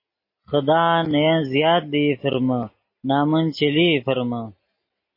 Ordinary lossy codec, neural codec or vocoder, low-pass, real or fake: MP3, 24 kbps; none; 5.4 kHz; real